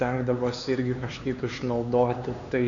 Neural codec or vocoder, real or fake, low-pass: codec, 16 kHz, 4 kbps, X-Codec, HuBERT features, trained on LibriSpeech; fake; 7.2 kHz